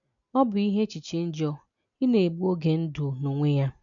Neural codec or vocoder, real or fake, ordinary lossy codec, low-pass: none; real; none; 7.2 kHz